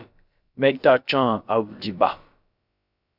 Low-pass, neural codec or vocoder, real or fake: 5.4 kHz; codec, 16 kHz, about 1 kbps, DyCAST, with the encoder's durations; fake